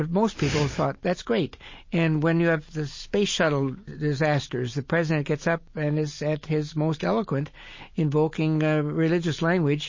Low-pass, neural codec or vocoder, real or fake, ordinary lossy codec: 7.2 kHz; none; real; MP3, 32 kbps